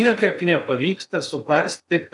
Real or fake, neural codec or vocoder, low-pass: fake; codec, 16 kHz in and 24 kHz out, 0.6 kbps, FocalCodec, streaming, 2048 codes; 10.8 kHz